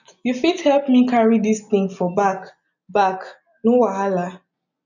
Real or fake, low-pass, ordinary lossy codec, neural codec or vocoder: real; 7.2 kHz; none; none